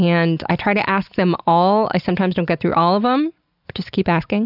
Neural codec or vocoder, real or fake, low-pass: none; real; 5.4 kHz